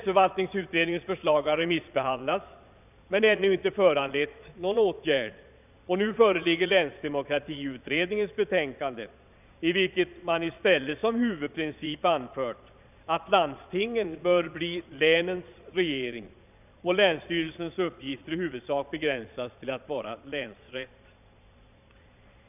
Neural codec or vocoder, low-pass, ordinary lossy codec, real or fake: vocoder, 22.05 kHz, 80 mel bands, Vocos; 3.6 kHz; none; fake